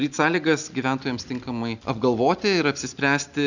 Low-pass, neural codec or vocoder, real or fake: 7.2 kHz; none; real